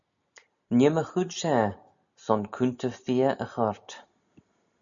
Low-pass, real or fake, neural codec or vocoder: 7.2 kHz; real; none